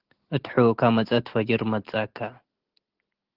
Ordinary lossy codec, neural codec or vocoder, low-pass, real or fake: Opus, 16 kbps; none; 5.4 kHz; real